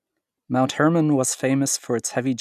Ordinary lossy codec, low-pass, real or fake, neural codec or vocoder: none; 14.4 kHz; real; none